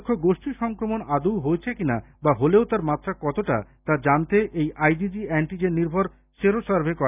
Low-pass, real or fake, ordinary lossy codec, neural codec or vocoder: 3.6 kHz; real; none; none